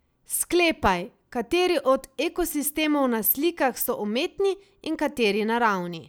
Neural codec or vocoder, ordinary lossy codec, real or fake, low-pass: none; none; real; none